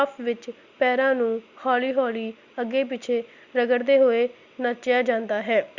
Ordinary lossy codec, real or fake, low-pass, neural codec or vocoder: Opus, 64 kbps; real; 7.2 kHz; none